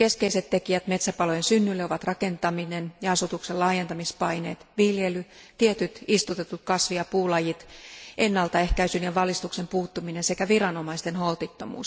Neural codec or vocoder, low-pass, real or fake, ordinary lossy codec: none; none; real; none